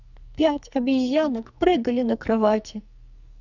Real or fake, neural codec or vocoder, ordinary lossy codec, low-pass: fake; codec, 44.1 kHz, 2.6 kbps, SNAC; none; 7.2 kHz